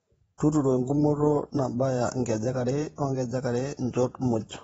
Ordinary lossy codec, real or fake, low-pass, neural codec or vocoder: AAC, 24 kbps; fake; 19.8 kHz; vocoder, 48 kHz, 128 mel bands, Vocos